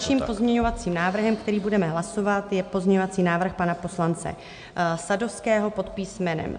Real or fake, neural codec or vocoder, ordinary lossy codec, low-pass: real; none; MP3, 64 kbps; 9.9 kHz